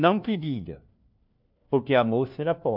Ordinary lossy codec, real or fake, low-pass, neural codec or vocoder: AAC, 48 kbps; fake; 5.4 kHz; codec, 16 kHz, 1 kbps, FunCodec, trained on LibriTTS, 50 frames a second